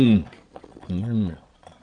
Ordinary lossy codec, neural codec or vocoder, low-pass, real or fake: none; vocoder, 22.05 kHz, 80 mel bands, Vocos; 9.9 kHz; fake